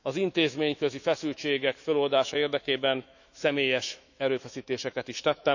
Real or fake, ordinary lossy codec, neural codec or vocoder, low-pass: fake; none; autoencoder, 48 kHz, 128 numbers a frame, DAC-VAE, trained on Japanese speech; 7.2 kHz